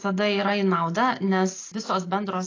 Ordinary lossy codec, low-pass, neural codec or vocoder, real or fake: AAC, 32 kbps; 7.2 kHz; none; real